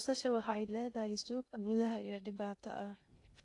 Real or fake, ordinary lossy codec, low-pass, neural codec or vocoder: fake; AAC, 48 kbps; 10.8 kHz; codec, 16 kHz in and 24 kHz out, 0.6 kbps, FocalCodec, streaming, 2048 codes